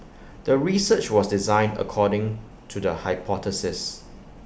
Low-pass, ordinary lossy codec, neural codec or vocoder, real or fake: none; none; none; real